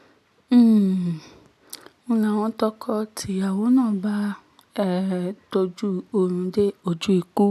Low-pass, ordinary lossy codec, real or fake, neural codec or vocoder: 14.4 kHz; none; real; none